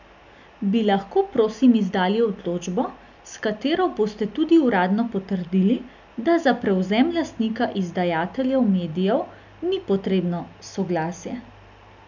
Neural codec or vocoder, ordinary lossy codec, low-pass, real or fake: none; none; 7.2 kHz; real